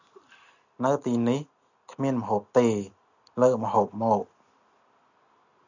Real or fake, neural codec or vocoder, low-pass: real; none; 7.2 kHz